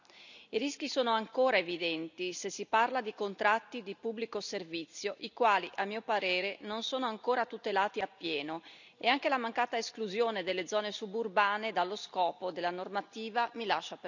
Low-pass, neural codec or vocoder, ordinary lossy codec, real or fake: 7.2 kHz; none; none; real